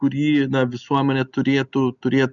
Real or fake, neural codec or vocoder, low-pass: real; none; 7.2 kHz